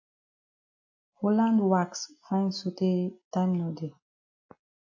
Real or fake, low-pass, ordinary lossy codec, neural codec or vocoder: real; 7.2 kHz; AAC, 48 kbps; none